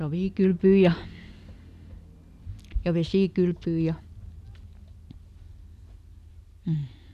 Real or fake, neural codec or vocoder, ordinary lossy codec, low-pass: real; none; none; 14.4 kHz